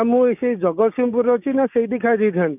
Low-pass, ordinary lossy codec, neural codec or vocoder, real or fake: 3.6 kHz; none; codec, 16 kHz, 6 kbps, DAC; fake